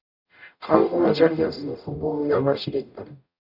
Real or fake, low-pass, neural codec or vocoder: fake; 5.4 kHz; codec, 44.1 kHz, 0.9 kbps, DAC